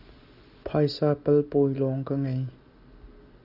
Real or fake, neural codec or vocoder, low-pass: real; none; 5.4 kHz